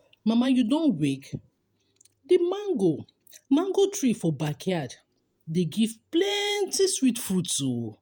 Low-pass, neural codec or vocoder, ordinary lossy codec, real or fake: none; vocoder, 48 kHz, 128 mel bands, Vocos; none; fake